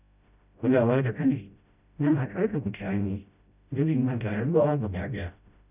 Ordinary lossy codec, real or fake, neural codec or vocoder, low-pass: none; fake; codec, 16 kHz, 0.5 kbps, FreqCodec, smaller model; 3.6 kHz